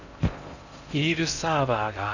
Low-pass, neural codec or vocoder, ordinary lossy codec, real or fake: 7.2 kHz; codec, 16 kHz in and 24 kHz out, 0.6 kbps, FocalCodec, streaming, 4096 codes; none; fake